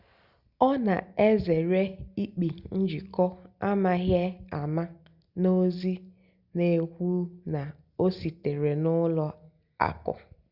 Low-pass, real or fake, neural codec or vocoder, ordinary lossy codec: 5.4 kHz; real; none; Opus, 64 kbps